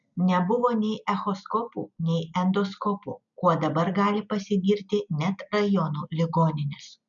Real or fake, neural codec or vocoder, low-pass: real; none; 7.2 kHz